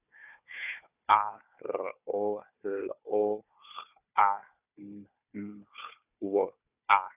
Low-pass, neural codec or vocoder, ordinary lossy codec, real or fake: 3.6 kHz; codec, 16 kHz, 4 kbps, FunCodec, trained on Chinese and English, 50 frames a second; none; fake